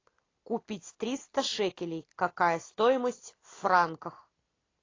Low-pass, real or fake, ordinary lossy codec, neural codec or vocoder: 7.2 kHz; real; AAC, 32 kbps; none